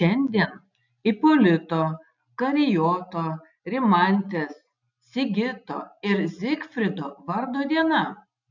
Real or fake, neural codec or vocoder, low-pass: real; none; 7.2 kHz